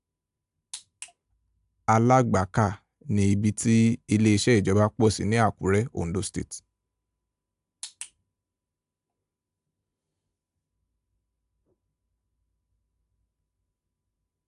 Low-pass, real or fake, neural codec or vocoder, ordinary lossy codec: 10.8 kHz; real; none; AAC, 96 kbps